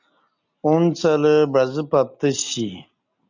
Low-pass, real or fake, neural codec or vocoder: 7.2 kHz; real; none